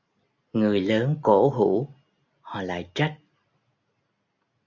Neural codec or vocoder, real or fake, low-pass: none; real; 7.2 kHz